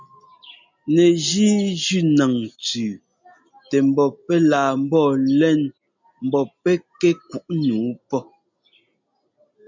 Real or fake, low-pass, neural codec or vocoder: real; 7.2 kHz; none